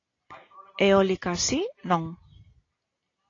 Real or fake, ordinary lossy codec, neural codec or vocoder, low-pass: real; AAC, 32 kbps; none; 7.2 kHz